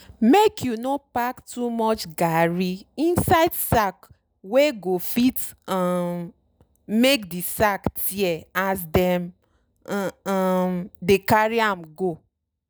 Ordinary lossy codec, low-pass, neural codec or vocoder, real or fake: none; none; none; real